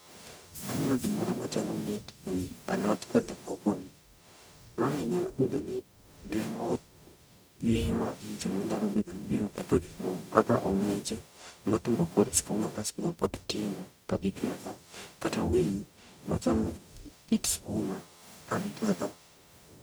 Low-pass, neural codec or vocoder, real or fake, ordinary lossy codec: none; codec, 44.1 kHz, 0.9 kbps, DAC; fake; none